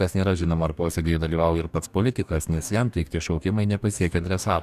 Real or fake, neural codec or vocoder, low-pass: fake; codec, 44.1 kHz, 2.6 kbps, DAC; 14.4 kHz